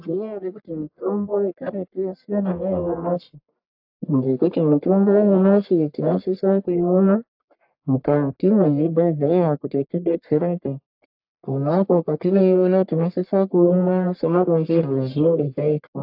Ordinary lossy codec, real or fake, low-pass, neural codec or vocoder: AAC, 48 kbps; fake; 5.4 kHz; codec, 44.1 kHz, 1.7 kbps, Pupu-Codec